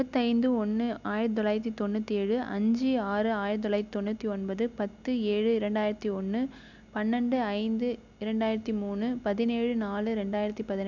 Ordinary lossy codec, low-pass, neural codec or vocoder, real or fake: MP3, 64 kbps; 7.2 kHz; none; real